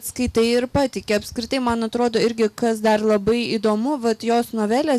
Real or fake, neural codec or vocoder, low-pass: real; none; 14.4 kHz